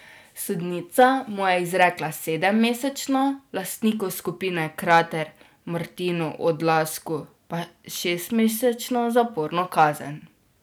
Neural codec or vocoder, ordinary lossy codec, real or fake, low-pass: none; none; real; none